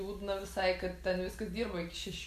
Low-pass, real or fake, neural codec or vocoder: 14.4 kHz; real; none